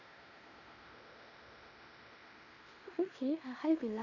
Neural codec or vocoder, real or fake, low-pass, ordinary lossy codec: codec, 16 kHz in and 24 kHz out, 0.9 kbps, LongCat-Audio-Codec, fine tuned four codebook decoder; fake; 7.2 kHz; none